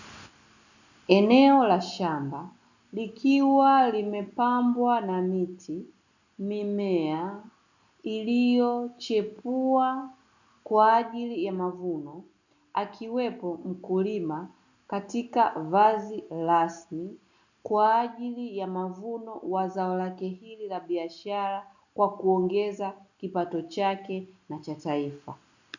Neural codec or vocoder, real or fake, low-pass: none; real; 7.2 kHz